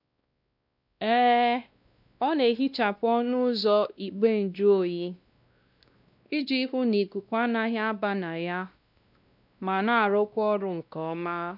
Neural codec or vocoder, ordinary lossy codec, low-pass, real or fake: codec, 16 kHz, 1 kbps, X-Codec, WavLM features, trained on Multilingual LibriSpeech; none; 5.4 kHz; fake